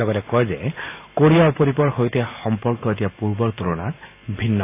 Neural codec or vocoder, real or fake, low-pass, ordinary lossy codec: none; real; 3.6 kHz; AAC, 24 kbps